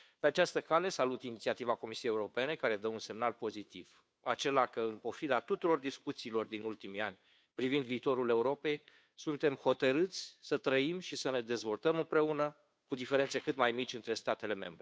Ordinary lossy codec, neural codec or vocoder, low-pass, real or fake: none; codec, 16 kHz, 2 kbps, FunCodec, trained on Chinese and English, 25 frames a second; none; fake